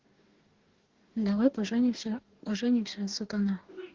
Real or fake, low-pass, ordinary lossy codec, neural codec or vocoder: fake; 7.2 kHz; Opus, 16 kbps; codec, 44.1 kHz, 2.6 kbps, DAC